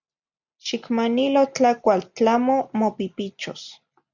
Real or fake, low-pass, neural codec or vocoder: real; 7.2 kHz; none